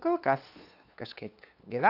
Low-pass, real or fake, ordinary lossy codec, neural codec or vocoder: 5.4 kHz; fake; AAC, 48 kbps; codec, 16 kHz, 2 kbps, FunCodec, trained on LibriTTS, 25 frames a second